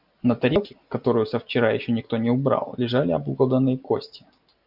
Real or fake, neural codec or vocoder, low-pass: real; none; 5.4 kHz